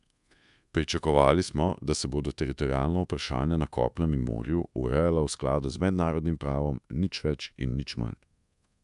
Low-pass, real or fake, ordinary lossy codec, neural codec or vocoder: 10.8 kHz; fake; MP3, 96 kbps; codec, 24 kHz, 1.2 kbps, DualCodec